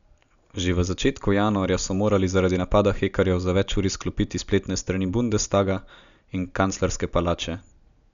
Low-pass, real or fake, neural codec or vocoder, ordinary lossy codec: 7.2 kHz; real; none; none